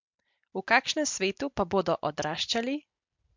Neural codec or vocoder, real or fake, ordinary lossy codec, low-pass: none; real; MP3, 64 kbps; 7.2 kHz